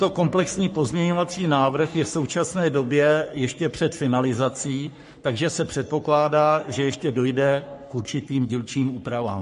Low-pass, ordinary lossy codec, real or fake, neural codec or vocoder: 14.4 kHz; MP3, 48 kbps; fake; codec, 44.1 kHz, 3.4 kbps, Pupu-Codec